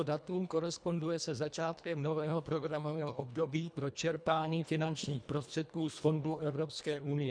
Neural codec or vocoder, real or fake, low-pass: codec, 24 kHz, 1.5 kbps, HILCodec; fake; 9.9 kHz